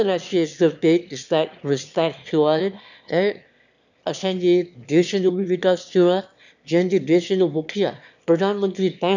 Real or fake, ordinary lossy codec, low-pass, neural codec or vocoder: fake; none; 7.2 kHz; autoencoder, 22.05 kHz, a latent of 192 numbers a frame, VITS, trained on one speaker